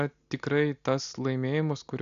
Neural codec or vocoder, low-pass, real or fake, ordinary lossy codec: none; 7.2 kHz; real; AAC, 96 kbps